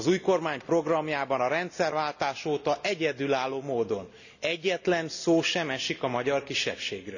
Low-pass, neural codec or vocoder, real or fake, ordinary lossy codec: 7.2 kHz; none; real; none